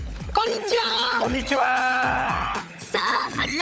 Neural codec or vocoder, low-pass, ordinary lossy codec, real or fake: codec, 16 kHz, 16 kbps, FunCodec, trained on LibriTTS, 50 frames a second; none; none; fake